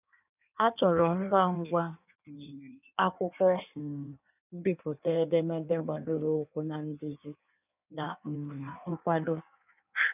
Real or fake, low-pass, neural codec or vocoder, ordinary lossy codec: fake; 3.6 kHz; codec, 16 kHz in and 24 kHz out, 1.1 kbps, FireRedTTS-2 codec; none